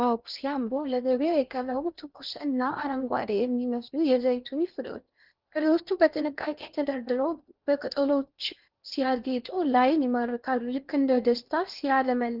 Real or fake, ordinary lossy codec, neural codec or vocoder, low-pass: fake; Opus, 24 kbps; codec, 16 kHz in and 24 kHz out, 0.8 kbps, FocalCodec, streaming, 65536 codes; 5.4 kHz